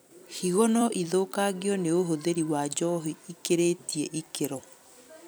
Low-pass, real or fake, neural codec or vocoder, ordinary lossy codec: none; fake; vocoder, 44.1 kHz, 128 mel bands every 256 samples, BigVGAN v2; none